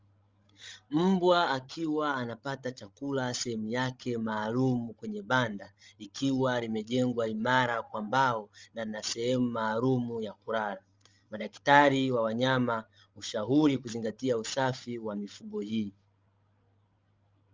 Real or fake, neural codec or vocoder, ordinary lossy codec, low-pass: fake; codec, 16 kHz, 16 kbps, FreqCodec, larger model; Opus, 32 kbps; 7.2 kHz